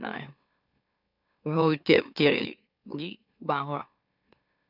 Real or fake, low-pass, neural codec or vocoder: fake; 5.4 kHz; autoencoder, 44.1 kHz, a latent of 192 numbers a frame, MeloTTS